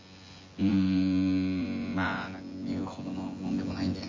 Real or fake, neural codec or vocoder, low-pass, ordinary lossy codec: fake; vocoder, 24 kHz, 100 mel bands, Vocos; 7.2 kHz; MP3, 32 kbps